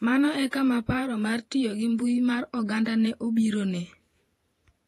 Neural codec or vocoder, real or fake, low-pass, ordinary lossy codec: vocoder, 48 kHz, 128 mel bands, Vocos; fake; 14.4 kHz; AAC, 48 kbps